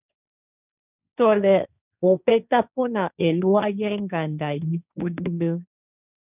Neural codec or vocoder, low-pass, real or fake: codec, 16 kHz, 1.1 kbps, Voila-Tokenizer; 3.6 kHz; fake